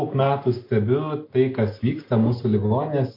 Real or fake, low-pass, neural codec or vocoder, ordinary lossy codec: real; 5.4 kHz; none; MP3, 32 kbps